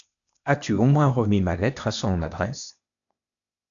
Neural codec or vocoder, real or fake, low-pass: codec, 16 kHz, 0.8 kbps, ZipCodec; fake; 7.2 kHz